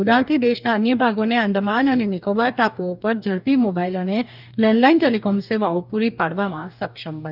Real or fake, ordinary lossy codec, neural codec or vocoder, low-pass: fake; none; codec, 44.1 kHz, 2.6 kbps, DAC; 5.4 kHz